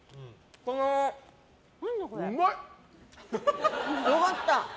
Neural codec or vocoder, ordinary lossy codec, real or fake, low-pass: none; none; real; none